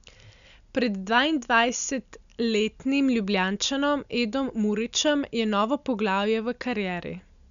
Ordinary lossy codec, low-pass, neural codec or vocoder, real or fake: none; 7.2 kHz; none; real